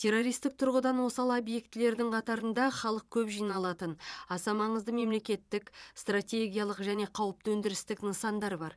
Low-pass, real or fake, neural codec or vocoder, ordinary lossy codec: none; fake; vocoder, 22.05 kHz, 80 mel bands, Vocos; none